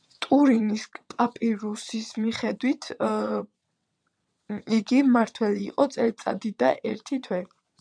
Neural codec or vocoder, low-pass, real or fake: vocoder, 22.05 kHz, 80 mel bands, WaveNeXt; 9.9 kHz; fake